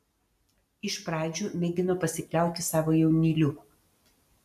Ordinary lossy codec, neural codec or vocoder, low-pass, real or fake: MP3, 96 kbps; none; 14.4 kHz; real